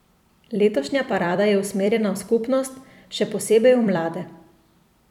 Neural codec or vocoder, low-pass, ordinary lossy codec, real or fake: vocoder, 44.1 kHz, 128 mel bands every 256 samples, BigVGAN v2; 19.8 kHz; none; fake